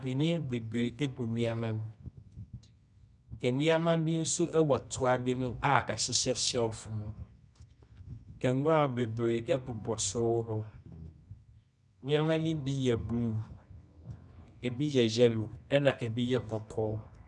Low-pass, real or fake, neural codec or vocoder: 10.8 kHz; fake; codec, 24 kHz, 0.9 kbps, WavTokenizer, medium music audio release